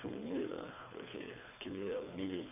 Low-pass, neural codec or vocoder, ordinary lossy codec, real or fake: 3.6 kHz; codec, 24 kHz, 3 kbps, HILCodec; AAC, 24 kbps; fake